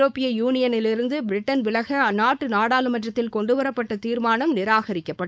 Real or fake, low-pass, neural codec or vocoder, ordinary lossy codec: fake; none; codec, 16 kHz, 4.8 kbps, FACodec; none